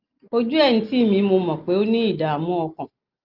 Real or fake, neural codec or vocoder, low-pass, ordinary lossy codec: real; none; 5.4 kHz; Opus, 24 kbps